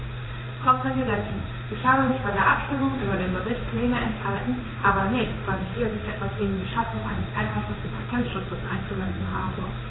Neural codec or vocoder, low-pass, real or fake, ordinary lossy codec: codec, 16 kHz in and 24 kHz out, 1 kbps, XY-Tokenizer; 7.2 kHz; fake; AAC, 16 kbps